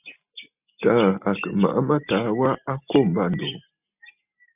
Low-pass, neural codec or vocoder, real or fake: 3.6 kHz; none; real